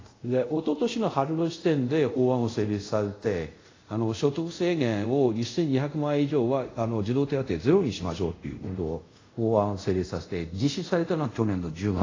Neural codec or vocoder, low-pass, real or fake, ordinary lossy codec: codec, 24 kHz, 0.5 kbps, DualCodec; 7.2 kHz; fake; AAC, 32 kbps